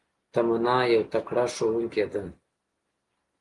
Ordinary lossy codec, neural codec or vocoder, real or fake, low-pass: Opus, 24 kbps; none; real; 10.8 kHz